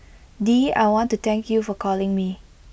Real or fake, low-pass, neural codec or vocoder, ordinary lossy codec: real; none; none; none